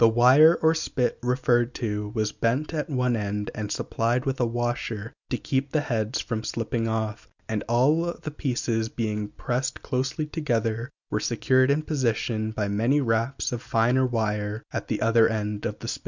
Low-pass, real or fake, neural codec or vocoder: 7.2 kHz; real; none